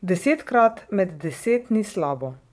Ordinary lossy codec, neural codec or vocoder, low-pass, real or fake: none; vocoder, 22.05 kHz, 80 mel bands, Vocos; none; fake